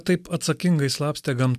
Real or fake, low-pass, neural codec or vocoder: real; 14.4 kHz; none